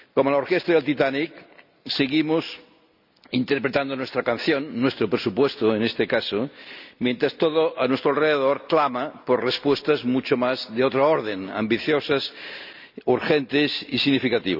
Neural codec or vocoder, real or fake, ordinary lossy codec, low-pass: none; real; none; 5.4 kHz